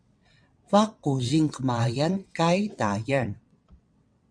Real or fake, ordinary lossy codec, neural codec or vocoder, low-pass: fake; MP3, 64 kbps; vocoder, 22.05 kHz, 80 mel bands, WaveNeXt; 9.9 kHz